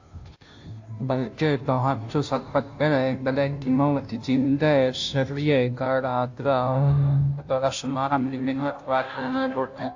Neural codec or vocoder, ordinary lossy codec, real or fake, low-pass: codec, 16 kHz, 0.5 kbps, FunCodec, trained on Chinese and English, 25 frames a second; AAC, 48 kbps; fake; 7.2 kHz